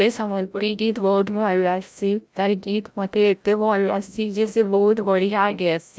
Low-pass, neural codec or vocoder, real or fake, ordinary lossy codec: none; codec, 16 kHz, 0.5 kbps, FreqCodec, larger model; fake; none